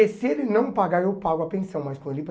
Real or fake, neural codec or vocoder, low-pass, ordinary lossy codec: real; none; none; none